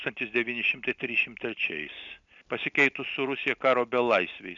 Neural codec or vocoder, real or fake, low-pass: none; real; 7.2 kHz